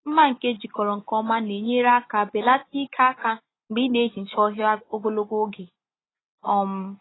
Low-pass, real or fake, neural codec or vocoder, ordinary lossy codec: 7.2 kHz; real; none; AAC, 16 kbps